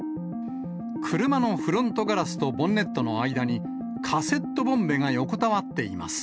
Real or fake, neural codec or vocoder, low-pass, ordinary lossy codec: real; none; none; none